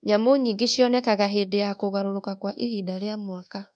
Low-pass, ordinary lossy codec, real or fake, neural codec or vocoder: 9.9 kHz; none; fake; codec, 24 kHz, 1.2 kbps, DualCodec